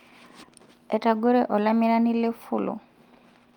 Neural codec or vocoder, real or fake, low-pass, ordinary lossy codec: none; real; 19.8 kHz; Opus, 32 kbps